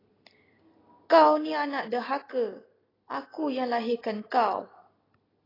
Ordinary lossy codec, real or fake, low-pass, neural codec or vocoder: AAC, 24 kbps; real; 5.4 kHz; none